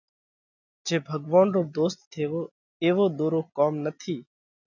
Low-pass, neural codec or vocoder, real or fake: 7.2 kHz; none; real